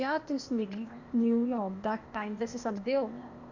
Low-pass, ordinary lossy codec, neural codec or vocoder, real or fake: 7.2 kHz; none; codec, 16 kHz, 0.8 kbps, ZipCodec; fake